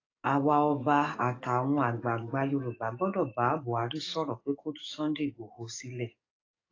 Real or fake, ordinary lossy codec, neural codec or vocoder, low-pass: fake; AAC, 32 kbps; codec, 44.1 kHz, 7.8 kbps, DAC; 7.2 kHz